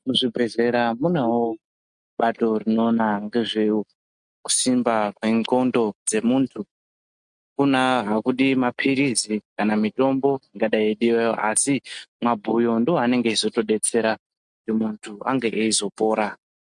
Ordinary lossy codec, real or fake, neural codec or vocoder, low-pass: MP3, 64 kbps; real; none; 9.9 kHz